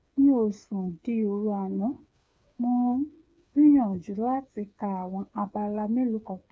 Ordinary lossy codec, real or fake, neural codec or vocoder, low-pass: none; fake; codec, 16 kHz, 8 kbps, FreqCodec, smaller model; none